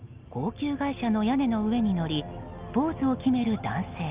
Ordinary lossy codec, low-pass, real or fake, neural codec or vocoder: Opus, 32 kbps; 3.6 kHz; real; none